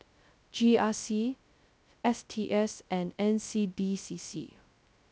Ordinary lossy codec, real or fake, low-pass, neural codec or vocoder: none; fake; none; codec, 16 kHz, 0.2 kbps, FocalCodec